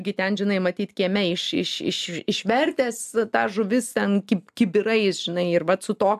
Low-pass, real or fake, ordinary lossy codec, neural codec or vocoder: 14.4 kHz; real; AAC, 96 kbps; none